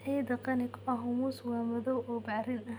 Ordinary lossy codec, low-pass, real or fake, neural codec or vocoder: none; 19.8 kHz; real; none